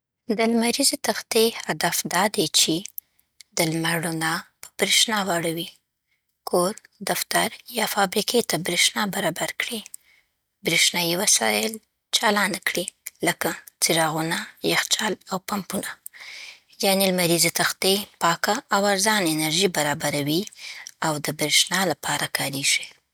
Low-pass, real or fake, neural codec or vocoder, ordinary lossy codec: none; real; none; none